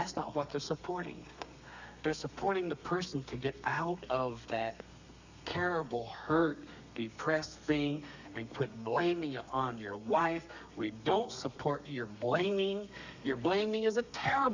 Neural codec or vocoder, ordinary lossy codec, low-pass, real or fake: codec, 32 kHz, 1.9 kbps, SNAC; Opus, 64 kbps; 7.2 kHz; fake